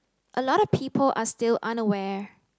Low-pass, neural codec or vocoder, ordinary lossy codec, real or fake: none; none; none; real